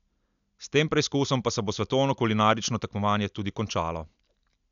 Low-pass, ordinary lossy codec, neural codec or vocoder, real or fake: 7.2 kHz; none; none; real